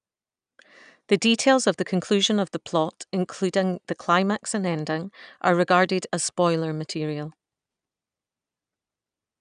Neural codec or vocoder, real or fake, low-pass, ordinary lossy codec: none; real; 9.9 kHz; none